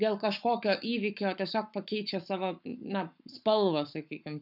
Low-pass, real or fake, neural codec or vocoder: 5.4 kHz; fake; codec, 44.1 kHz, 7.8 kbps, Pupu-Codec